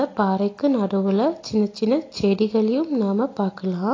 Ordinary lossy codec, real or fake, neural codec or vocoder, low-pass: AAC, 32 kbps; real; none; 7.2 kHz